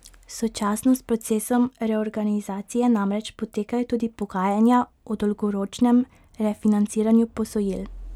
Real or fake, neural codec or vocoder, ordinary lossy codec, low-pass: real; none; none; 19.8 kHz